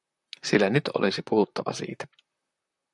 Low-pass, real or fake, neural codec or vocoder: 10.8 kHz; fake; vocoder, 44.1 kHz, 128 mel bands, Pupu-Vocoder